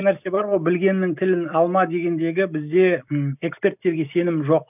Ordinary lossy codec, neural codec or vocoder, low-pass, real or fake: none; none; 3.6 kHz; real